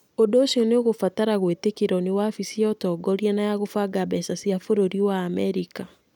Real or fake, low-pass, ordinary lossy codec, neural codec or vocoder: real; 19.8 kHz; none; none